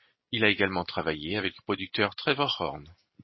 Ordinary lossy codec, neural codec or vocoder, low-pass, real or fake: MP3, 24 kbps; none; 7.2 kHz; real